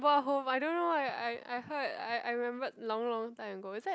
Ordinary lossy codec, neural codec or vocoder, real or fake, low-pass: none; none; real; none